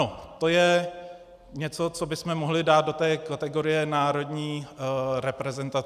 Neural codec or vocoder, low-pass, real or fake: none; 14.4 kHz; real